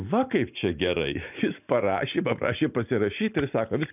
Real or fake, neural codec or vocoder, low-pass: real; none; 3.6 kHz